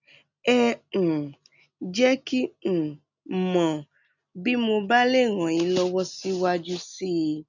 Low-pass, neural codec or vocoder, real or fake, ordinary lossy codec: 7.2 kHz; none; real; none